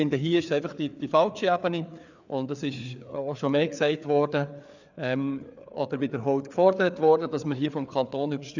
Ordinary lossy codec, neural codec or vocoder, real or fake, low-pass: none; codec, 16 kHz, 4 kbps, FreqCodec, larger model; fake; 7.2 kHz